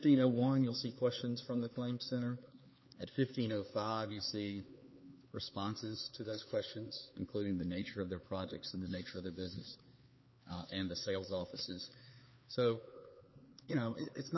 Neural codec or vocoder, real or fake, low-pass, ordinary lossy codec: codec, 16 kHz, 4 kbps, X-Codec, HuBERT features, trained on LibriSpeech; fake; 7.2 kHz; MP3, 24 kbps